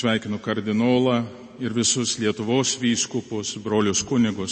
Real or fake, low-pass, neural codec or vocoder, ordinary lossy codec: real; 9.9 kHz; none; MP3, 32 kbps